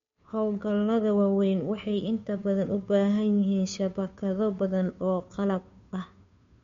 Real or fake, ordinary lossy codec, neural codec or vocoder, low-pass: fake; MP3, 48 kbps; codec, 16 kHz, 2 kbps, FunCodec, trained on Chinese and English, 25 frames a second; 7.2 kHz